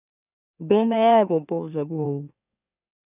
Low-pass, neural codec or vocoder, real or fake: 3.6 kHz; autoencoder, 44.1 kHz, a latent of 192 numbers a frame, MeloTTS; fake